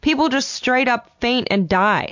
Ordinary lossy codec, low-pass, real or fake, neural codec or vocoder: MP3, 48 kbps; 7.2 kHz; real; none